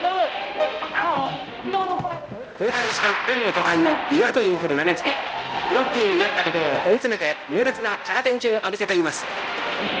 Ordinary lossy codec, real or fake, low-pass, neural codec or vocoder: none; fake; none; codec, 16 kHz, 0.5 kbps, X-Codec, HuBERT features, trained on balanced general audio